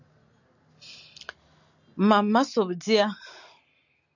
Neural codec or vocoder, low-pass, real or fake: none; 7.2 kHz; real